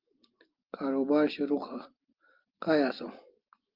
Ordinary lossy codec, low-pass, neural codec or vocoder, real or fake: Opus, 32 kbps; 5.4 kHz; none; real